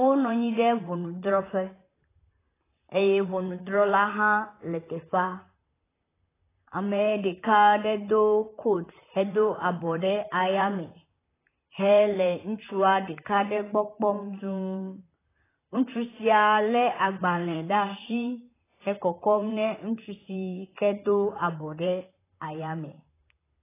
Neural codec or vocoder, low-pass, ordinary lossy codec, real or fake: vocoder, 44.1 kHz, 128 mel bands, Pupu-Vocoder; 3.6 kHz; AAC, 16 kbps; fake